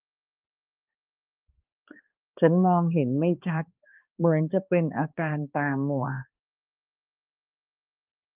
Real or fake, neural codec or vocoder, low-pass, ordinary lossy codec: fake; codec, 16 kHz, 2 kbps, X-Codec, HuBERT features, trained on balanced general audio; 3.6 kHz; Opus, 32 kbps